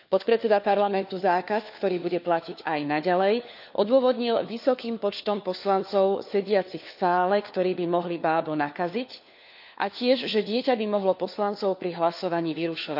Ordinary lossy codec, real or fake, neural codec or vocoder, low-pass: none; fake; codec, 16 kHz, 4 kbps, FunCodec, trained on LibriTTS, 50 frames a second; 5.4 kHz